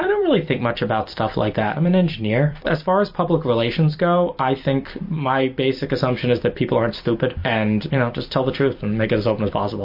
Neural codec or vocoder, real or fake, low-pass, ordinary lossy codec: vocoder, 44.1 kHz, 128 mel bands every 256 samples, BigVGAN v2; fake; 5.4 kHz; MP3, 32 kbps